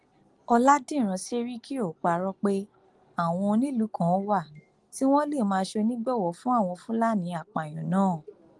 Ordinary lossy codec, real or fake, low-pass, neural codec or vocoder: Opus, 24 kbps; real; 10.8 kHz; none